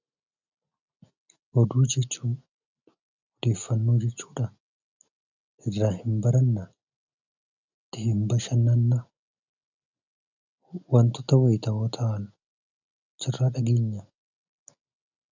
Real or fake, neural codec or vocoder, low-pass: real; none; 7.2 kHz